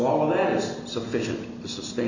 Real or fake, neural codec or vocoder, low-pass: real; none; 7.2 kHz